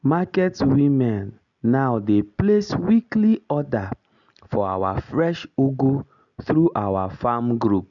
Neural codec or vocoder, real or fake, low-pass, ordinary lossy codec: none; real; 7.2 kHz; none